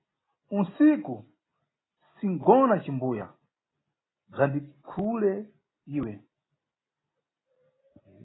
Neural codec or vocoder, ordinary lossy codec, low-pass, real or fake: none; AAC, 16 kbps; 7.2 kHz; real